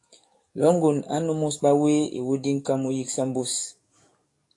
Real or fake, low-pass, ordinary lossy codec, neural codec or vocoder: fake; 10.8 kHz; AAC, 48 kbps; codec, 44.1 kHz, 7.8 kbps, DAC